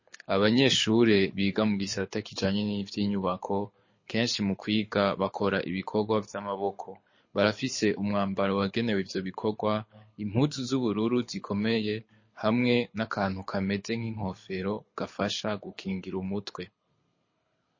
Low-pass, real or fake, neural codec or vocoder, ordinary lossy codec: 7.2 kHz; fake; codec, 24 kHz, 6 kbps, HILCodec; MP3, 32 kbps